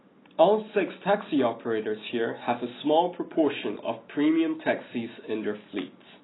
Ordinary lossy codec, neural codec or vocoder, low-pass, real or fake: AAC, 16 kbps; none; 7.2 kHz; real